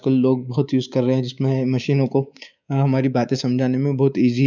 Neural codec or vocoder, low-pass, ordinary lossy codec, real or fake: codec, 24 kHz, 3.1 kbps, DualCodec; 7.2 kHz; none; fake